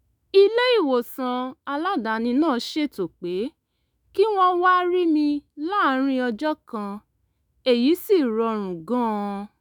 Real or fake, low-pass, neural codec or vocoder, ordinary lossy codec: fake; none; autoencoder, 48 kHz, 128 numbers a frame, DAC-VAE, trained on Japanese speech; none